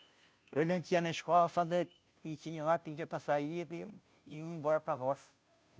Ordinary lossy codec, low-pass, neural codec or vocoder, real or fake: none; none; codec, 16 kHz, 0.5 kbps, FunCodec, trained on Chinese and English, 25 frames a second; fake